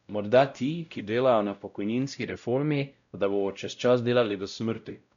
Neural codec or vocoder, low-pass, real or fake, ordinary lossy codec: codec, 16 kHz, 0.5 kbps, X-Codec, WavLM features, trained on Multilingual LibriSpeech; 7.2 kHz; fake; MP3, 96 kbps